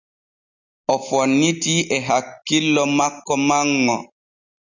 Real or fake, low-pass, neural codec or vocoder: real; 7.2 kHz; none